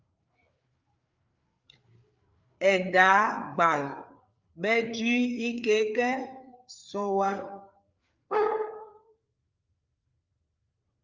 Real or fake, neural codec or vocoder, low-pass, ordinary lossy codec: fake; codec, 16 kHz, 4 kbps, FreqCodec, larger model; 7.2 kHz; Opus, 24 kbps